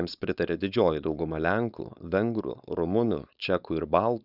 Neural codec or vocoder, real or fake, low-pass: codec, 16 kHz, 4.8 kbps, FACodec; fake; 5.4 kHz